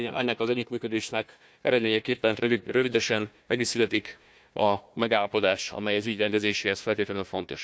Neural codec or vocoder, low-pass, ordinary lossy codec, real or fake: codec, 16 kHz, 1 kbps, FunCodec, trained on Chinese and English, 50 frames a second; none; none; fake